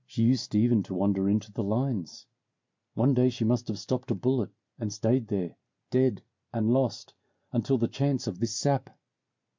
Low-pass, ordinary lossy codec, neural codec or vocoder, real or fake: 7.2 kHz; MP3, 64 kbps; none; real